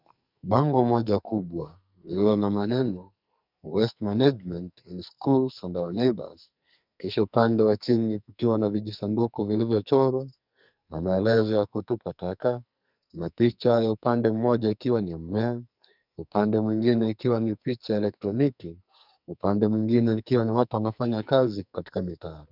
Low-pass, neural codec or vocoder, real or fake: 5.4 kHz; codec, 44.1 kHz, 2.6 kbps, SNAC; fake